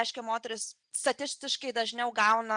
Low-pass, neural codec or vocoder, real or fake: 9.9 kHz; none; real